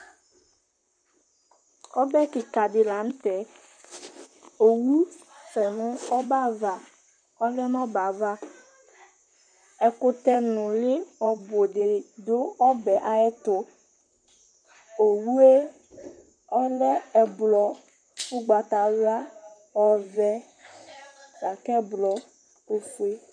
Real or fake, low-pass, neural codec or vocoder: fake; 9.9 kHz; vocoder, 44.1 kHz, 128 mel bands, Pupu-Vocoder